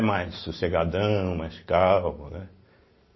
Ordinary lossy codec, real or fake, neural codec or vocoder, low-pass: MP3, 24 kbps; real; none; 7.2 kHz